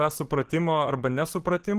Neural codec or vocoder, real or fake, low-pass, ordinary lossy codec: codec, 44.1 kHz, 7.8 kbps, DAC; fake; 14.4 kHz; Opus, 16 kbps